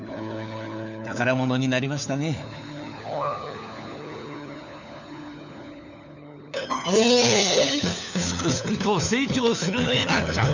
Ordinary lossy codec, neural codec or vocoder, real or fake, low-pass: none; codec, 16 kHz, 4 kbps, FunCodec, trained on LibriTTS, 50 frames a second; fake; 7.2 kHz